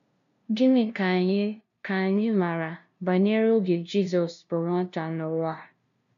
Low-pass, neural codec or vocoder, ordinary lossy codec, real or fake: 7.2 kHz; codec, 16 kHz, 0.5 kbps, FunCodec, trained on LibriTTS, 25 frames a second; none; fake